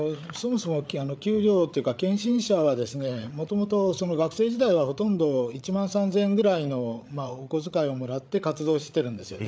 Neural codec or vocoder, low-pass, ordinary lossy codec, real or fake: codec, 16 kHz, 8 kbps, FreqCodec, larger model; none; none; fake